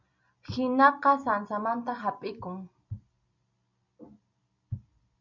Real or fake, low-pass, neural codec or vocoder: real; 7.2 kHz; none